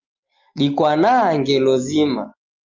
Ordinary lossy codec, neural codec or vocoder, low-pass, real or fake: Opus, 32 kbps; none; 7.2 kHz; real